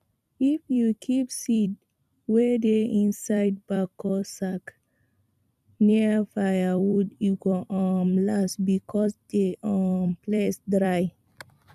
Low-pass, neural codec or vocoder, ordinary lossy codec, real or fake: 14.4 kHz; vocoder, 44.1 kHz, 128 mel bands every 256 samples, BigVGAN v2; none; fake